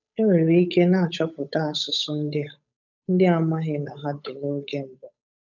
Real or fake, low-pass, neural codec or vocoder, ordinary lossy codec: fake; 7.2 kHz; codec, 16 kHz, 8 kbps, FunCodec, trained on Chinese and English, 25 frames a second; none